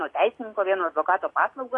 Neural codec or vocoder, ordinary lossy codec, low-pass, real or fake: none; AAC, 32 kbps; 10.8 kHz; real